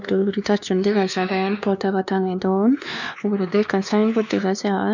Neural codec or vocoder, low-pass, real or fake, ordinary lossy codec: codec, 16 kHz, 2 kbps, X-Codec, WavLM features, trained on Multilingual LibriSpeech; 7.2 kHz; fake; none